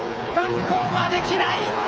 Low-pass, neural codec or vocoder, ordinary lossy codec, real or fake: none; codec, 16 kHz, 4 kbps, FreqCodec, smaller model; none; fake